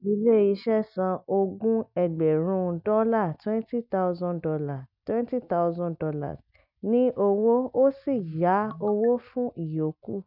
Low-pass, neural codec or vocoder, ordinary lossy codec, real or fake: 5.4 kHz; none; none; real